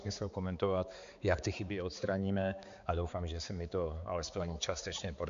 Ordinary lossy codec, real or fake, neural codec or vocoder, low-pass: MP3, 64 kbps; fake; codec, 16 kHz, 4 kbps, X-Codec, HuBERT features, trained on balanced general audio; 7.2 kHz